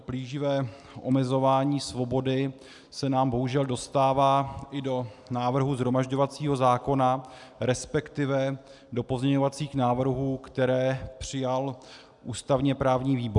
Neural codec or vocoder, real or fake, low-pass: none; real; 10.8 kHz